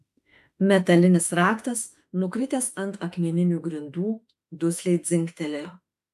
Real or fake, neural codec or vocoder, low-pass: fake; autoencoder, 48 kHz, 32 numbers a frame, DAC-VAE, trained on Japanese speech; 14.4 kHz